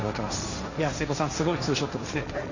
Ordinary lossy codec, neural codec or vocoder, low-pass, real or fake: none; codec, 16 kHz, 1.1 kbps, Voila-Tokenizer; 7.2 kHz; fake